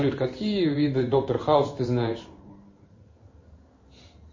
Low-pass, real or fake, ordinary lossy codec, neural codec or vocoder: 7.2 kHz; fake; MP3, 32 kbps; codec, 16 kHz in and 24 kHz out, 1 kbps, XY-Tokenizer